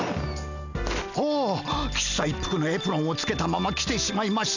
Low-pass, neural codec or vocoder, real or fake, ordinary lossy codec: 7.2 kHz; none; real; none